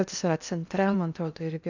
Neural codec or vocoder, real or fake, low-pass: codec, 16 kHz in and 24 kHz out, 0.6 kbps, FocalCodec, streaming, 2048 codes; fake; 7.2 kHz